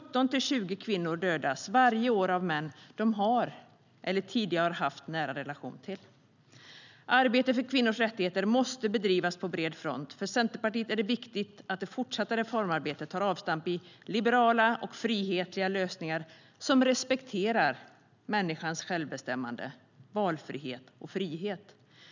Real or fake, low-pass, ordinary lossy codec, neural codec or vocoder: real; 7.2 kHz; none; none